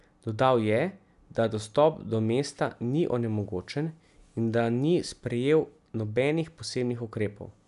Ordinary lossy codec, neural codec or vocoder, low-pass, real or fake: none; none; 10.8 kHz; real